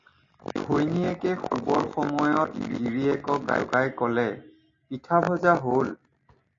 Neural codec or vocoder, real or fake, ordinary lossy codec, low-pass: none; real; AAC, 48 kbps; 7.2 kHz